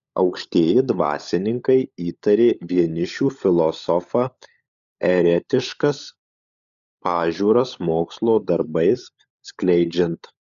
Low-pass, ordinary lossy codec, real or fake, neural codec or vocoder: 7.2 kHz; MP3, 96 kbps; fake; codec, 16 kHz, 16 kbps, FunCodec, trained on LibriTTS, 50 frames a second